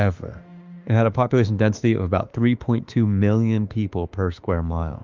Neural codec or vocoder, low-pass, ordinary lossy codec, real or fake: autoencoder, 48 kHz, 32 numbers a frame, DAC-VAE, trained on Japanese speech; 7.2 kHz; Opus, 32 kbps; fake